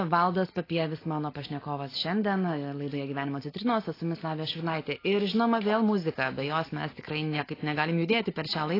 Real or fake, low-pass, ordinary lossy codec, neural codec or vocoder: real; 5.4 kHz; AAC, 24 kbps; none